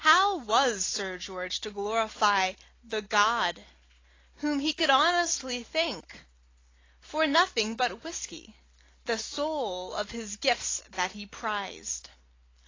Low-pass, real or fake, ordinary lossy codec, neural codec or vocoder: 7.2 kHz; real; AAC, 32 kbps; none